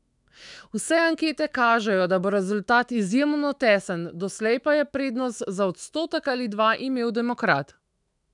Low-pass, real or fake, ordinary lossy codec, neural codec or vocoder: 10.8 kHz; fake; none; autoencoder, 48 kHz, 128 numbers a frame, DAC-VAE, trained on Japanese speech